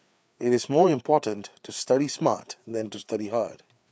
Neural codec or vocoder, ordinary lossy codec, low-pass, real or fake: codec, 16 kHz, 4 kbps, FreqCodec, larger model; none; none; fake